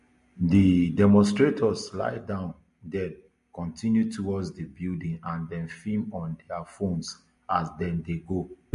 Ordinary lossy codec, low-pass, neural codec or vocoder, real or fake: MP3, 48 kbps; 10.8 kHz; none; real